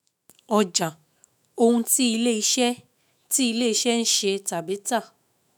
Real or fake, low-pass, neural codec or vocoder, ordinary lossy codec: fake; none; autoencoder, 48 kHz, 128 numbers a frame, DAC-VAE, trained on Japanese speech; none